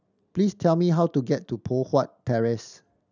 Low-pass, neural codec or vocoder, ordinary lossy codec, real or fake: 7.2 kHz; none; none; real